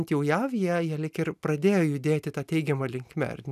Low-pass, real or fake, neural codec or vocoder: 14.4 kHz; real; none